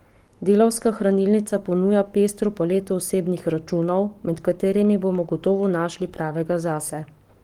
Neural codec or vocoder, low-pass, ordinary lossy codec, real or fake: codec, 44.1 kHz, 7.8 kbps, Pupu-Codec; 19.8 kHz; Opus, 24 kbps; fake